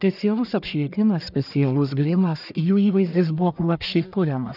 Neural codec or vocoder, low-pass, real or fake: codec, 44.1 kHz, 1.7 kbps, Pupu-Codec; 5.4 kHz; fake